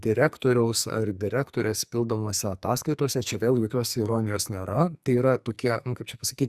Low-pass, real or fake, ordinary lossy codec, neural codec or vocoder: 14.4 kHz; fake; Opus, 64 kbps; codec, 44.1 kHz, 2.6 kbps, SNAC